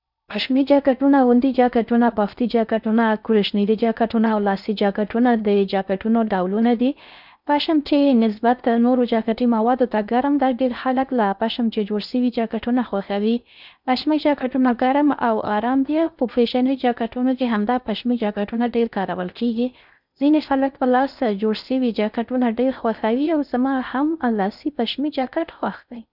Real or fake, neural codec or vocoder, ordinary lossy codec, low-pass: fake; codec, 16 kHz in and 24 kHz out, 0.6 kbps, FocalCodec, streaming, 4096 codes; none; 5.4 kHz